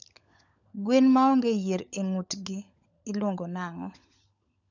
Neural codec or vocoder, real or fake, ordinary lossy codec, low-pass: codec, 16 kHz, 16 kbps, FunCodec, trained on LibriTTS, 50 frames a second; fake; none; 7.2 kHz